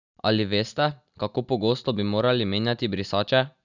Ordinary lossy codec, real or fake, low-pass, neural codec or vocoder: none; real; 7.2 kHz; none